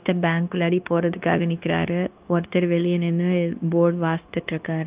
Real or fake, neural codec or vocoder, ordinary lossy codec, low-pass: fake; codec, 16 kHz, 0.9 kbps, LongCat-Audio-Codec; Opus, 32 kbps; 3.6 kHz